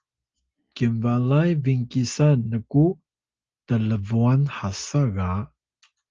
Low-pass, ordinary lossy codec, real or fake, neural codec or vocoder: 7.2 kHz; Opus, 24 kbps; real; none